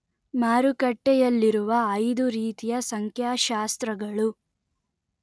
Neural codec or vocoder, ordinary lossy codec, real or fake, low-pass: none; none; real; none